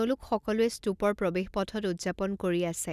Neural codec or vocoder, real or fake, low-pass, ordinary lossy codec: none; real; 14.4 kHz; none